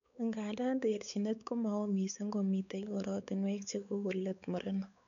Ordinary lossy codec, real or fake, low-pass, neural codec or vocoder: none; fake; 7.2 kHz; codec, 16 kHz, 4 kbps, X-Codec, WavLM features, trained on Multilingual LibriSpeech